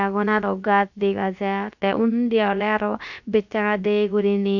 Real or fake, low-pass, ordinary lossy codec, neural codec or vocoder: fake; 7.2 kHz; none; codec, 16 kHz, about 1 kbps, DyCAST, with the encoder's durations